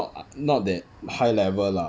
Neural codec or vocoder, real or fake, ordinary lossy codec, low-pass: none; real; none; none